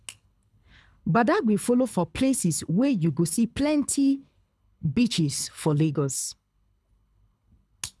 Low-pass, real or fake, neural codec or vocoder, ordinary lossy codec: none; fake; codec, 24 kHz, 6 kbps, HILCodec; none